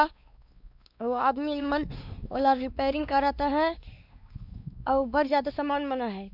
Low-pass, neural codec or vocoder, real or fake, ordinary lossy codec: 5.4 kHz; codec, 16 kHz, 2 kbps, X-Codec, WavLM features, trained on Multilingual LibriSpeech; fake; none